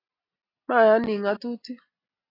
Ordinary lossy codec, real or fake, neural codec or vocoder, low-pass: AAC, 32 kbps; real; none; 5.4 kHz